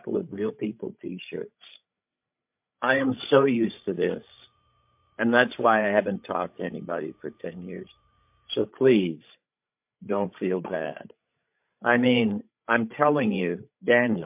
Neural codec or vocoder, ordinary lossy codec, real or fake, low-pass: codec, 16 kHz, 16 kbps, FreqCodec, larger model; MP3, 32 kbps; fake; 3.6 kHz